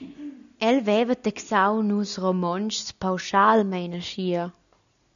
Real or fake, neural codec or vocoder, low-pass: real; none; 7.2 kHz